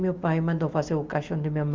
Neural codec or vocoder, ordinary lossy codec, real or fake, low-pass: none; Opus, 32 kbps; real; 7.2 kHz